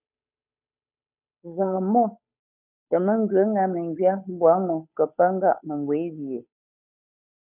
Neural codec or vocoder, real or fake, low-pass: codec, 16 kHz, 8 kbps, FunCodec, trained on Chinese and English, 25 frames a second; fake; 3.6 kHz